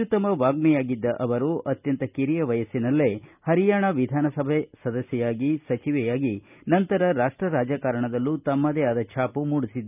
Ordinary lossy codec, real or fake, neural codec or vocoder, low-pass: none; real; none; 3.6 kHz